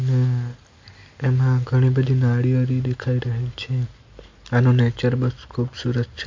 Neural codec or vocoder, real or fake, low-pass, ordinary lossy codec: none; real; 7.2 kHz; MP3, 48 kbps